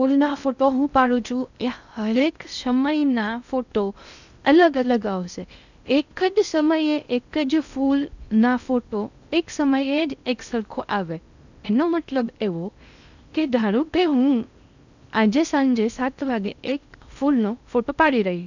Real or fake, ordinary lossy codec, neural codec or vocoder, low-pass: fake; none; codec, 16 kHz in and 24 kHz out, 0.6 kbps, FocalCodec, streaming, 2048 codes; 7.2 kHz